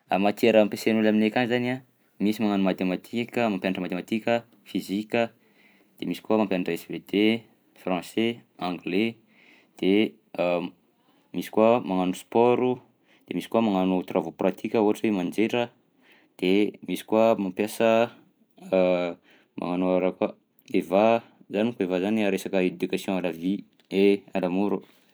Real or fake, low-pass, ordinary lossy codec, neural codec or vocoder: fake; none; none; vocoder, 44.1 kHz, 128 mel bands every 256 samples, BigVGAN v2